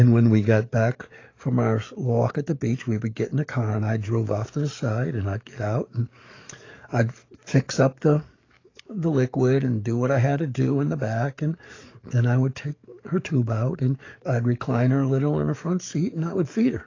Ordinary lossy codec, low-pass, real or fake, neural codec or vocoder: AAC, 32 kbps; 7.2 kHz; fake; codec, 44.1 kHz, 7.8 kbps, DAC